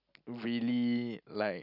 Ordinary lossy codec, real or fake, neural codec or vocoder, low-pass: none; real; none; 5.4 kHz